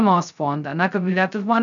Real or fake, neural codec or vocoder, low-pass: fake; codec, 16 kHz, 0.2 kbps, FocalCodec; 7.2 kHz